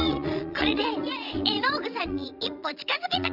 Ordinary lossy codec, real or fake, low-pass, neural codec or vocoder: none; fake; 5.4 kHz; vocoder, 22.05 kHz, 80 mel bands, Vocos